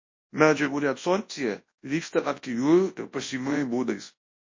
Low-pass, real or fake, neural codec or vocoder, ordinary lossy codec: 7.2 kHz; fake; codec, 24 kHz, 0.9 kbps, WavTokenizer, large speech release; MP3, 32 kbps